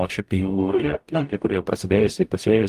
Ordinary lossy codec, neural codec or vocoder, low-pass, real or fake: Opus, 32 kbps; codec, 44.1 kHz, 0.9 kbps, DAC; 14.4 kHz; fake